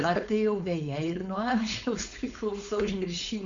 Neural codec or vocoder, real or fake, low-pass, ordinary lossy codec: codec, 16 kHz, 4.8 kbps, FACodec; fake; 7.2 kHz; Opus, 64 kbps